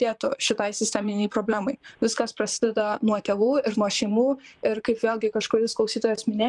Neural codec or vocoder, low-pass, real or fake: vocoder, 44.1 kHz, 128 mel bands, Pupu-Vocoder; 10.8 kHz; fake